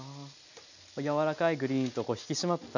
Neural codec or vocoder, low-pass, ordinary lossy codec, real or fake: none; 7.2 kHz; none; real